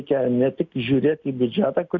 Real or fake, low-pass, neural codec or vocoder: real; 7.2 kHz; none